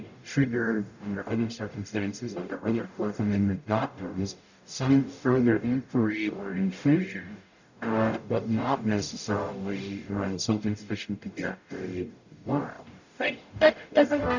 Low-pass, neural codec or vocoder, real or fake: 7.2 kHz; codec, 44.1 kHz, 0.9 kbps, DAC; fake